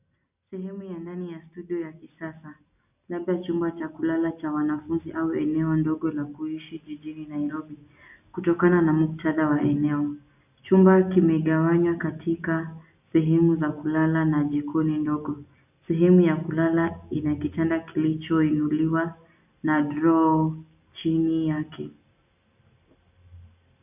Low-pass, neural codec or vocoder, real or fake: 3.6 kHz; none; real